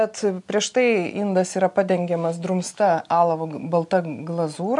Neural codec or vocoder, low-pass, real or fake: none; 10.8 kHz; real